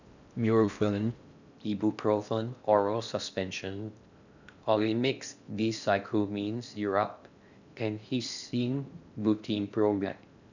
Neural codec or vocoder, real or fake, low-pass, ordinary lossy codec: codec, 16 kHz in and 24 kHz out, 0.6 kbps, FocalCodec, streaming, 4096 codes; fake; 7.2 kHz; none